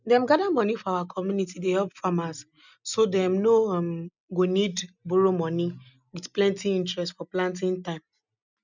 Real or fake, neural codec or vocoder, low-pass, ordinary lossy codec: real; none; 7.2 kHz; none